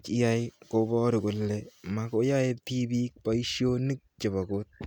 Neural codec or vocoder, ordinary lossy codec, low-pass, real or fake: none; none; 19.8 kHz; real